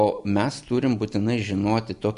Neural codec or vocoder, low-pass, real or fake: none; 10.8 kHz; real